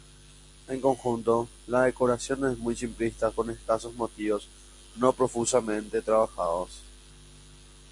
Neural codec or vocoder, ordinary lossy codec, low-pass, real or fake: none; MP3, 64 kbps; 10.8 kHz; real